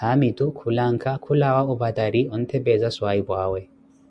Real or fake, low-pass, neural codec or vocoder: real; 9.9 kHz; none